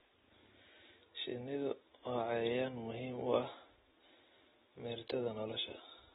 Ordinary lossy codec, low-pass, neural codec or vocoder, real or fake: AAC, 16 kbps; 19.8 kHz; none; real